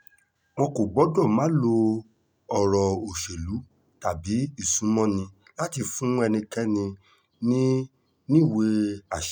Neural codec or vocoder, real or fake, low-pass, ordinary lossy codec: none; real; none; none